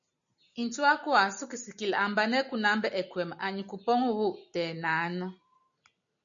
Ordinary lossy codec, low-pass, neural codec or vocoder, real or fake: MP3, 96 kbps; 7.2 kHz; none; real